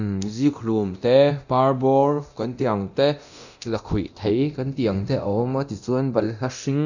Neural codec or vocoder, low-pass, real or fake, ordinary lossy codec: codec, 24 kHz, 0.9 kbps, DualCodec; 7.2 kHz; fake; none